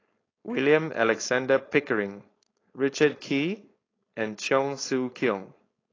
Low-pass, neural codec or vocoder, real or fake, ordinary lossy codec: 7.2 kHz; codec, 16 kHz, 4.8 kbps, FACodec; fake; AAC, 32 kbps